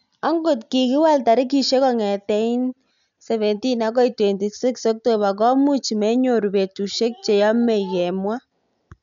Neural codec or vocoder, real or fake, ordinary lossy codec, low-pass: none; real; none; 7.2 kHz